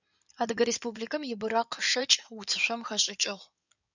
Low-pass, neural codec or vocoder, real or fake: 7.2 kHz; codec, 16 kHz in and 24 kHz out, 2.2 kbps, FireRedTTS-2 codec; fake